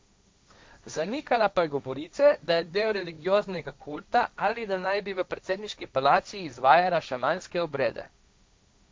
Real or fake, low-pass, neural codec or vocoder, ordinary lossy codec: fake; none; codec, 16 kHz, 1.1 kbps, Voila-Tokenizer; none